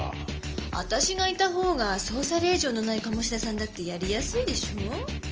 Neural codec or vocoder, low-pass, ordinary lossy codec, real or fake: none; 7.2 kHz; Opus, 24 kbps; real